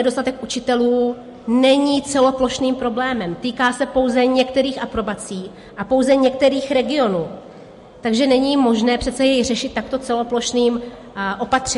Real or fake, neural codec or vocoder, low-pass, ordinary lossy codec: real; none; 14.4 kHz; MP3, 48 kbps